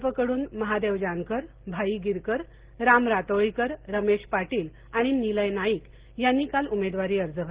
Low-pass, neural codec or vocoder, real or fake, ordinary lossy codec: 3.6 kHz; none; real; Opus, 16 kbps